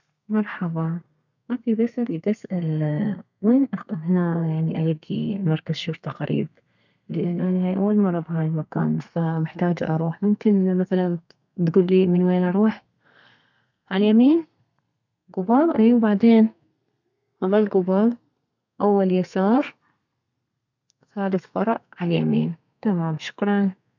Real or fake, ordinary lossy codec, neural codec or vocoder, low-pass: fake; none; codec, 32 kHz, 1.9 kbps, SNAC; 7.2 kHz